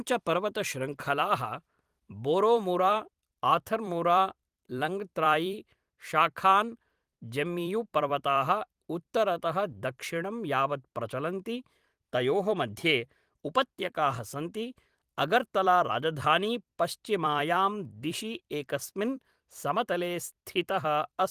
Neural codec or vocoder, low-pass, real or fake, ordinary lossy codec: vocoder, 44.1 kHz, 128 mel bands every 256 samples, BigVGAN v2; 14.4 kHz; fake; Opus, 24 kbps